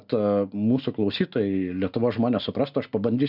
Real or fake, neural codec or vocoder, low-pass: real; none; 5.4 kHz